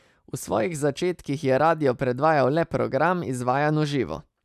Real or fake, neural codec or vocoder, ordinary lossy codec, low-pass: real; none; none; 14.4 kHz